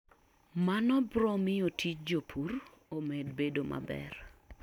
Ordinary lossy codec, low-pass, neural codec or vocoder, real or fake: none; 19.8 kHz; none; real